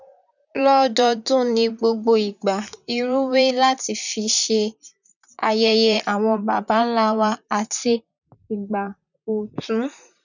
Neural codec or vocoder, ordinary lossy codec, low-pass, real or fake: vocoder, 44.1 kHz, 128 mel bands, Pupu-Vocoder; none; 7.2 kHz; fake